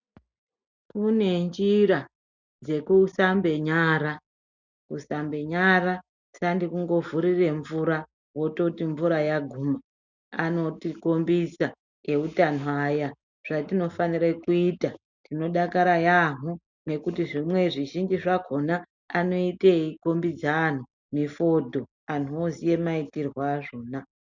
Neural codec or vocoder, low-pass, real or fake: none; 7.2 kHz; real